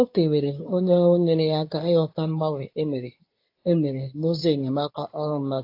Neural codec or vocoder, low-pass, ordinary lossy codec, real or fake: codec, 24 kHz, 0.9 kbps, WavTokenizer, medium speech release version 2; 5.4 kHz; AAC, 32 kbps; fake